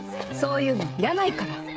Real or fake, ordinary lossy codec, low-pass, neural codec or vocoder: fake; none; none; codec, 16 kHz, 16 kbps, FreqCodec, smaller model